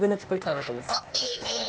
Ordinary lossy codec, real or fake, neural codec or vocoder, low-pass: none; fake; codec, 16 kHz, 0.8 kbps, ZipCodec; none